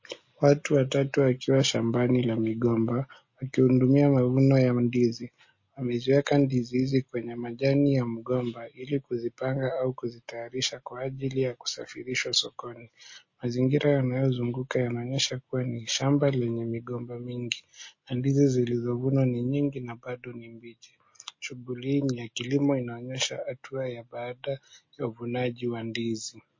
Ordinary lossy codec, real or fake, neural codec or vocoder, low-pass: MP3, 32 kbps; real; none; 7.2 kHz